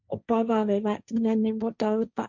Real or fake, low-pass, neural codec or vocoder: fake; 7.2 kHz; codec, 16 kHz, 1.1 kbps, Voila-Tokenizer